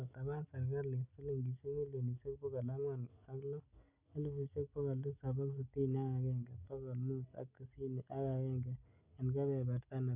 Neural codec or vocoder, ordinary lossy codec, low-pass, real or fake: none; none; 3.6 kHz; real